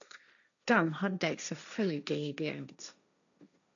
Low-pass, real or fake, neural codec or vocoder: 7.2 kHz; fake; codec, 16 kHz, 1.1 kbps, Voila-Tokenizer